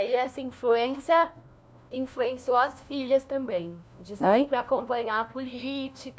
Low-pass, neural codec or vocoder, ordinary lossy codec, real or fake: none; codec, 16 kHz, 1 kbps, FunCodec, trained on LibriTTS, 50 frames a second; none; fake